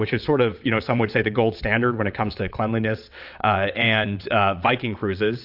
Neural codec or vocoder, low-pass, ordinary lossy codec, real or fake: vocoder, 22.05 kHz, 80 mel bands, WaveNeXt; 5.4 kHz; AAC, 48 kbps; fake